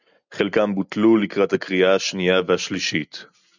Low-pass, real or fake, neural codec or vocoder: 7.2 kHz; real; none